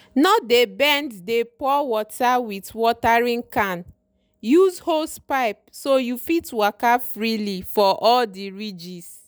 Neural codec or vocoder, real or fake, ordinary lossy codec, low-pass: none; real; none; none